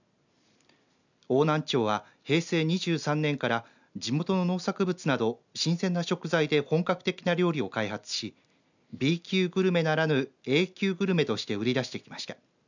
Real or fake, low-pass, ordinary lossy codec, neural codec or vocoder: real; 7.2 kHz; none; none